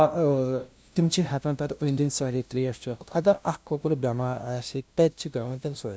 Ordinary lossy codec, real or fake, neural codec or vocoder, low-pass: none; fake; codec, 16 kHz, 0.5 kbps, FunCodec, trained on LibriTTS, 25 frames a second; none